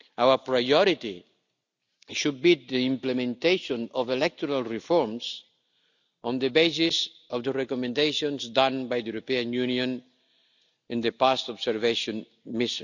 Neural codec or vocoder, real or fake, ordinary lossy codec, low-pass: none; real; none; 7.2 kHz